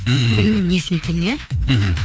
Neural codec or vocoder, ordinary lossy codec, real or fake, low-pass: codec, 16 kHz, 2 kbps, FreqCodec, larger model; none; fake; none